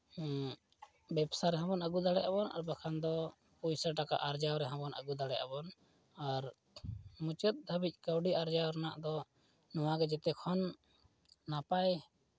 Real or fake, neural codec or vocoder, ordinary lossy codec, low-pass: real; none; none; none